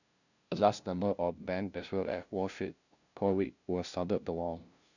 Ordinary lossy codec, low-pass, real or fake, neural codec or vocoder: none; 7.2 kHz; fake; codec, 16 kHz, 0.5 kbps, FunCodec, trained on LibriTTS, 25 frames a second